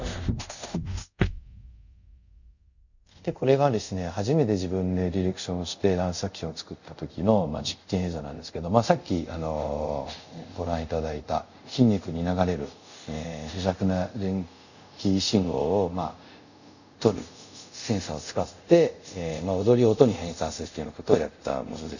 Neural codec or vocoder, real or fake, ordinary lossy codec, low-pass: codec, 24 kHz, 0.5 kbps, DualCodec; fake; none; 7.2 kHz